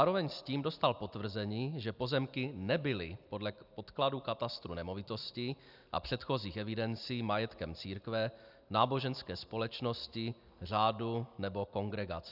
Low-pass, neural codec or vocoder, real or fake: 5.4 kHz; none; real